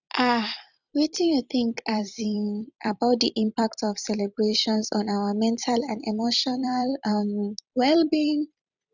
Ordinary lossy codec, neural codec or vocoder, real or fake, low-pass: none; vocoder, 44.1 kHz, 128 mel bands every 256 samples, BigVGAN v2; fake; 7.2 kHz